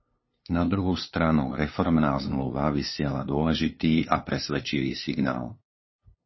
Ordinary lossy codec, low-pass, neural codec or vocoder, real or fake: MP3, 24 kbps; 7.2 kHz; codec, 16 kHz, 2 kbps, FunCodec, trained on LibriTTS, 25 frames a second; fake